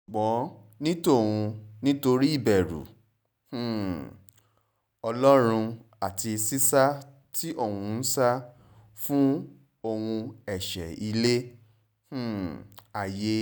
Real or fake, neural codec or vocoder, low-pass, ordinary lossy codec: real; none; none; none